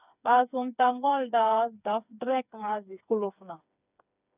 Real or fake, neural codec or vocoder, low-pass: fake; codec, 16 kHz, 4 kbps, FreqCodec, smaller model; 3.6 kHz